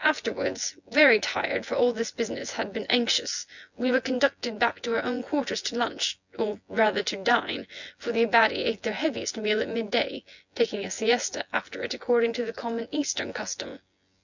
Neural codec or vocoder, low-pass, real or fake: vocoder, 24 kHz, 100 mel bands, Vocos; 7.2 kHz; fake